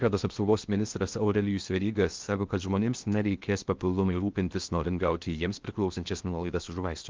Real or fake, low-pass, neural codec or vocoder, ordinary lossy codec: fake; 7.2 kHz; codec, 16 kHz in and 24 kHz out, 0.8 kbps, FocalCodec, streaming, 65536 codes; Opus, 32 kbps